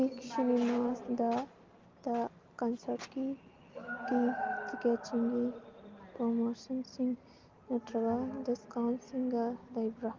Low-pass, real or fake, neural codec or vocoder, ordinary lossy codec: 7.2 kHz; real; none; Opus, 32 kbps